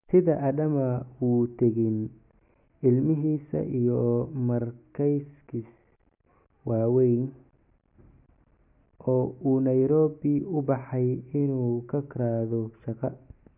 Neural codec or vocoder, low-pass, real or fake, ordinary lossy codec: none; 3.6 kHz; real; none